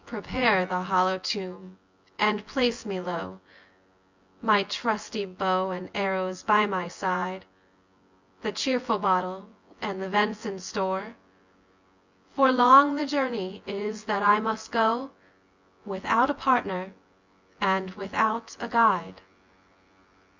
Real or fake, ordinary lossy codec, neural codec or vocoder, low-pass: fake; Opus, 64 kbps; vocoder, 24 kHz, 100 mel bands, Vocos; 7.2 kHz